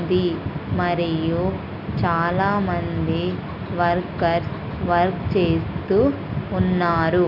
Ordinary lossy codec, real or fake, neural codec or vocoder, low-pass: none; real; none; 5.4 kHz